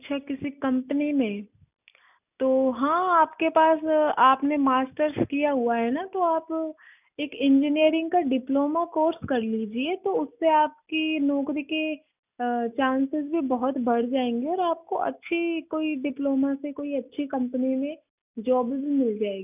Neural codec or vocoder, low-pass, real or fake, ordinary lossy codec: none; 3.6 kHz; real; none